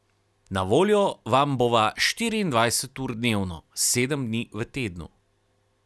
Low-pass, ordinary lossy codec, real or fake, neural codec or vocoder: none; none; real; none